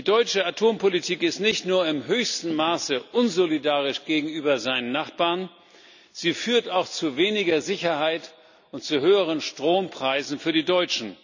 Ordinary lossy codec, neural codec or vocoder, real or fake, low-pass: none; none; real; 7.2 kHz